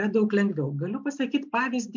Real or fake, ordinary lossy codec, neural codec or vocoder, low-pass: real; MP3, 64 kbps; none; 7.2 kHz